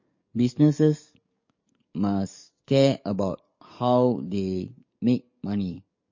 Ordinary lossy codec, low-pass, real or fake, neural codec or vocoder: MP3, 32 kbps; 7.2 kHz; fake; codec, 44.1 kHz, 7.8 kbps, DAC